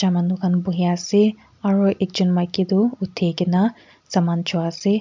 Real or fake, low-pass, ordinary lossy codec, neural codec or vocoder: real; 7.2 kHz; MP3, 64 kbps; none